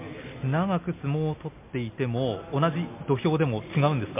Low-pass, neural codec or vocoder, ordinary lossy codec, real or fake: 3.6 kHz; none; MP3, 24 kbps; real